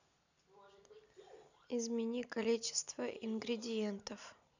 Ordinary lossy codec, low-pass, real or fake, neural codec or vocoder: none; 7.2 kHz; real; none